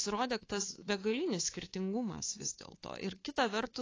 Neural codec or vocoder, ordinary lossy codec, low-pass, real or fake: codec, 24 kHz, 3.1 kbps, DualCodec; AAC, 32 kbps; 7.2 kHz; fake